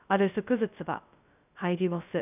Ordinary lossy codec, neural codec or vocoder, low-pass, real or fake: none; codec, 16 kHz, 0.2 kbps, FocalCodec; 3.6 kHz; fake